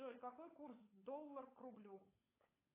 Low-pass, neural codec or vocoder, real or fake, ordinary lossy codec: 3.6 kHz; codec, 16 kHz, 16 kbps, FunCodec, trained on LibriTTS, 50 frames a second; fake; AAC, 24 kbps